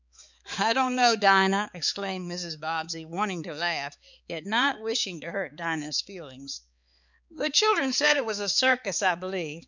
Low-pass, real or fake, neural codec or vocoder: 7.2 kHz; fake; codec, 16 kHz, 4 kbps, X-Codec, HuBERT features, trained on balanced general audio